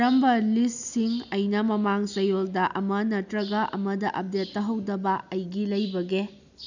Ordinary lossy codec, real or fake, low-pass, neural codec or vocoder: none; real; 7.2 kHz; none